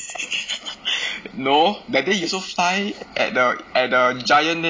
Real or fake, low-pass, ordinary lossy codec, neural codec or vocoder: real; none; none; none